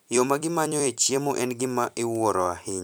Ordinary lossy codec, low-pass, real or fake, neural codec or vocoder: none; none; fake; vocoder, 44.1 kHz, 128 mel bands every 256 samples, BigVGAN v2